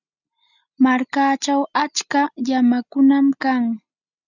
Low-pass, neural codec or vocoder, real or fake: 7.2 kHz; none; real